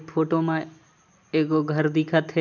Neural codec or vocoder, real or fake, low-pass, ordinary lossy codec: none; real; 7.2 kHz; none